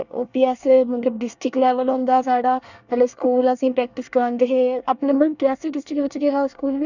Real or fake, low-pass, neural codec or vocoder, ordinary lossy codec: fake; 7.2 kHz; codec, 24 kHz, 1 kbps, SNAC; none